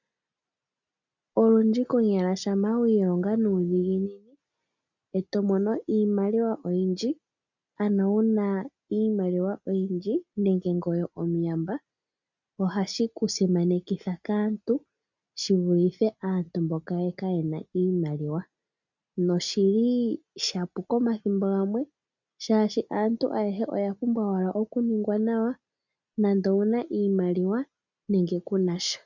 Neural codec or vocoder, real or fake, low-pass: none; real; 7.2 kHz